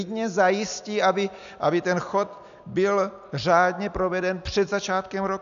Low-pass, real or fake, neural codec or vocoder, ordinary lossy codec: 7.2 kHz; real; none; AAC, 96 kbps